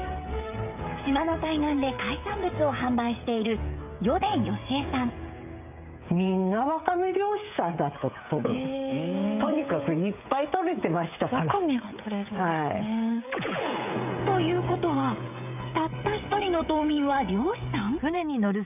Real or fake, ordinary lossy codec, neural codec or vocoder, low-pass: fake; none; codec, 16 kHz, 16 kbps, FreqCodec, smaller model; 3.6 kHz